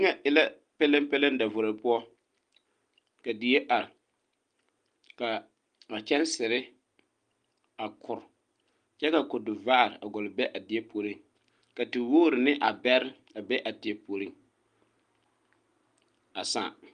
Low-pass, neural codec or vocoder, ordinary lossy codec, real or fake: 9.9 kHz; none; Opus, 32 kbps; real